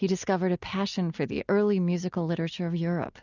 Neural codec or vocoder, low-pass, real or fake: none; 7.2 kHz; real